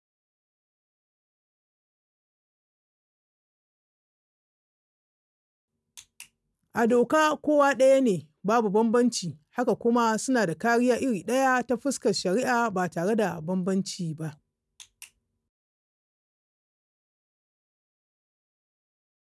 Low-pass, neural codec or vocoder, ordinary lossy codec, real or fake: none; none; none; real